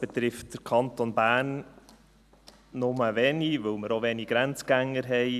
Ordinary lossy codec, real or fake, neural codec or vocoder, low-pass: none; real; none; 14.4 kHz